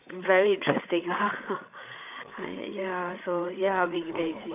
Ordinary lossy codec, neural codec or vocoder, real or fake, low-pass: none; codec, 16 kHz, 8 kbps, FreqCodec, larger model; fake; 3.6 kHz